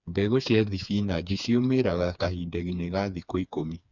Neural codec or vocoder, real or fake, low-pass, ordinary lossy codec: codec, 16 kHz, 4 kbps, FreqCodec, smaller model; fake; 7.2 kHz; none